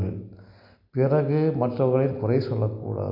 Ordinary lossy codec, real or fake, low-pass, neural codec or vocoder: MP3, 48 kbps; real; 5.4 kHz; none